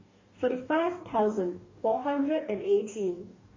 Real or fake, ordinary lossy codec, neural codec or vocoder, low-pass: fake; MP3, 32 kbps; codec, 44.1 kHz, 2.6 kbps, DAC; 7.2 kHz